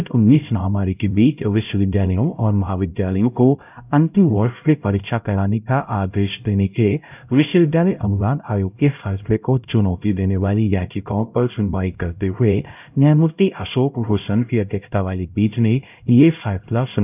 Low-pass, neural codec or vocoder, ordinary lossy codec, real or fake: 3.6 kHz; codec, 16 kHz, 0.5 kbps, X-Codec, HuBERT features, trained on LibriSpeech; none; fake